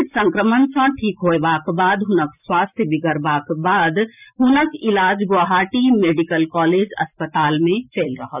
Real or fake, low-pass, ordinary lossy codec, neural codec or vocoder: real; 3.6 kHz; none; none